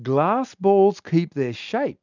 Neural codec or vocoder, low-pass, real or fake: none; 7.2 kHz; real